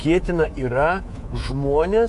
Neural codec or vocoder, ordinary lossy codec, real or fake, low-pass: codec, 24 kHz, 3.1 kbps, DualCodec; AAC, 64 kbps; fake; 10.8 kHz